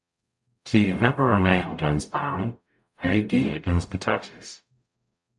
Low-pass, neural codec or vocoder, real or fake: 10.8 kHz; codec, 44.1 kHz, 0.9 kbps, DAC; fake